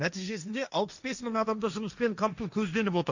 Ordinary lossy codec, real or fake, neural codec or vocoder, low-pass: none; fake; codec, 16 kHz, 1.1 kbps, Voila-Tokenizer; 7.2 kHz